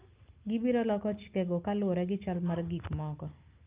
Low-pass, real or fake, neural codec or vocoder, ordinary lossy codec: 3.6 kHz; real; none; Opus, 32 kbps